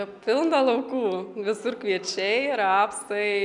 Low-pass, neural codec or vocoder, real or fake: 10.8 kHz; none; real